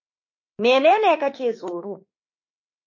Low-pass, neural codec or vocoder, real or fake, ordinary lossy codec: 7.2 kHz; autoencoder, 48 kHz, 32 numbers a frame, DAC-VAE, trained on Japanese speech; fake; MP3, 32 kbps